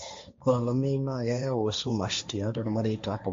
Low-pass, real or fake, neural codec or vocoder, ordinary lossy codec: 7.2 kHz; fake; codec, 16 kHz, 1.1 kbps, Voila-Tokenizer; MP3, 48 kbps